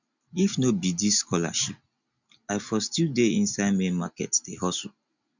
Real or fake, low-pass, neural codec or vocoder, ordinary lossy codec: real; 7.2 kHz; none; none